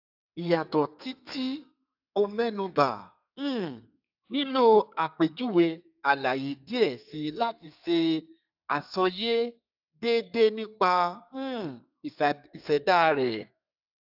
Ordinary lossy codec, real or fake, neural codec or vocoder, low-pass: AAC, 48 kbps; fake; codec, 32 kHz, 1.9 kbps, SNAC; 5.4 kHz